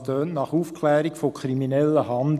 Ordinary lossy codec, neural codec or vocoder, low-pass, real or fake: none; none; 14.4 kHz; real